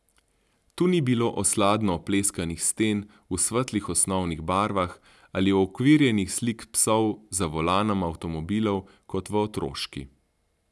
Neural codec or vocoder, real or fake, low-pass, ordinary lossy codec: none; real; none; none